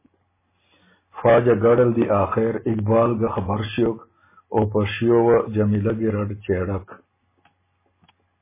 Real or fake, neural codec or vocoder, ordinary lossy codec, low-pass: real; none; MP3, 16 kbps; 3.6 kHz